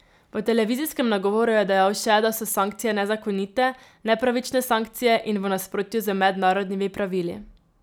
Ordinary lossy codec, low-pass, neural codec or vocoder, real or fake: none; none; none; real